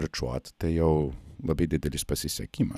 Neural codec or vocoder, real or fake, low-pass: none; real; 14.4 kHz